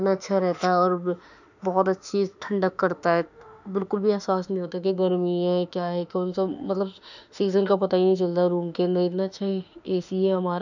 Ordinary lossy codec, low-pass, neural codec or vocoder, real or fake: none; 7.2 kHz; autoencoder, 48 kHz, 32 numbers a frame, DAC-VAE, trained on Japanese speech; fake